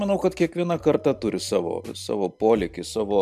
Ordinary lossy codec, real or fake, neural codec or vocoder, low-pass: MP3, 96 kbps; real; none; 14.4 kHz